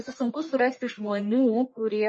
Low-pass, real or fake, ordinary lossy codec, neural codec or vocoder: 10.8 kHz; fake; MP3, 32 kbps; codec, 44.1 kHz, 1.7 kbps, Pupu-Codec